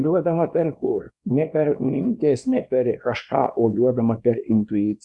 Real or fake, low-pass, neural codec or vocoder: fake; 10.8 kHz; codec, 24 kHz, 0.9 kbps, WavTokenizer, small release